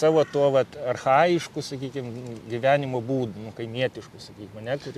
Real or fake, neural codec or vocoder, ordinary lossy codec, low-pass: real; none; MP3, 96 kbps; 14.4 kHz